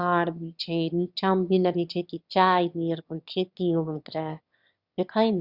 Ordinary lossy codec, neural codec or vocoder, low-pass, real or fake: Opus, 64 kbps; autoencoder, 22.05 kHz, a latent of 192 numbers a frame, VITS, trained on one speaker; 5.4 kHz; fake